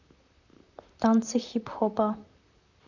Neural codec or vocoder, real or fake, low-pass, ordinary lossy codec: vocoder, 44.1 kHz, 128 mel bands, Pupu-Vocoder; fake; 7.2 kHz; none